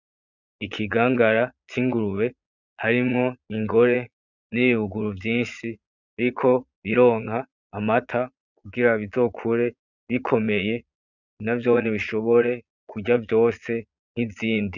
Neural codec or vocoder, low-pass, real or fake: vocoder, 22.05 kHz, 80 mel bands, Vocos; 7.2 kHz; fake